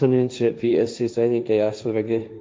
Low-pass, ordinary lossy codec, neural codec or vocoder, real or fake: none; none; codec, 16 kHz, 1.1 kbps, Voila-Tokenizer; fake